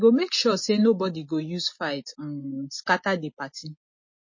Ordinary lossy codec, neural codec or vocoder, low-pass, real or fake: MP3, 32 kbps; none; 7.2 kHz; real